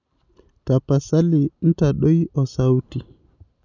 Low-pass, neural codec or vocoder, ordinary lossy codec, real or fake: 7.2 kHz; none; none; real